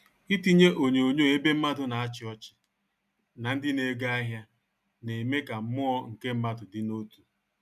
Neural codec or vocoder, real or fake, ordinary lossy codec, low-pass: none; real; none; 14.4 kHz